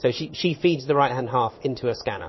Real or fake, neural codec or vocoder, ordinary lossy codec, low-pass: fake; codec, 16 kHz in and 24 kHz out, 1 kbps, XY-Tokenizer; MP3, 24 kbps; 7.2 kHz